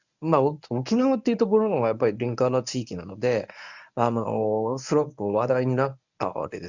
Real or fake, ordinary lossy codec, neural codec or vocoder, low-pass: fake; none; codec, 24 kHz, 0.9 kbps, WavTokenizer, medium speech release version 1; 7.2 kHz